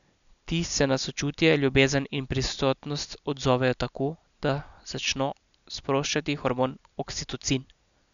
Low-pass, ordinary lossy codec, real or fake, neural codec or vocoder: 7.2 kHz; none; real; none